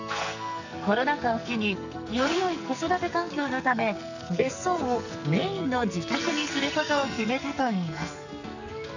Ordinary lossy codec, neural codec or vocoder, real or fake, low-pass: none; codec, 44.1 kHz, 2.6 kbps, SNAC; fake; 7.2 kHz